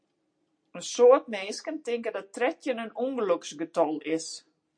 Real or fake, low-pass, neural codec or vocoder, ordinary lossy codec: real; 9.9 kHz; none; MP3, 48 kbps